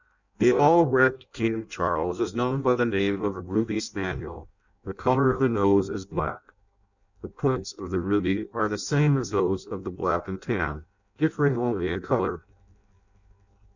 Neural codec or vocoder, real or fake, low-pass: codec, 16 kHz in and 24 kHz out, 0.6 kbps, FireRedTTS-2 codec; fake; 7.2 kHz